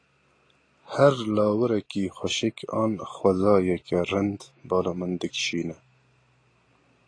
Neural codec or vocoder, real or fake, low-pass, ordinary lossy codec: none; real; 9.9 kHz; AAC, 32 kbps